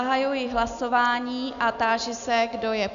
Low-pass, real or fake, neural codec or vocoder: 7.2 kHz; real; none